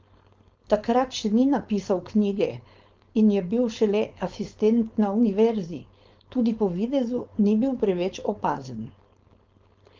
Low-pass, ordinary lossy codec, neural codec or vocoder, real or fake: 7.2 kHz; Opus, 32 kbps; codec, 16 kHz, 4.8 kbps, FACodec; fake